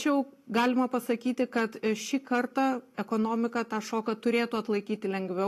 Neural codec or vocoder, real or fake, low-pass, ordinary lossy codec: none; real; 14.4 kHz; AAC, 48 kbps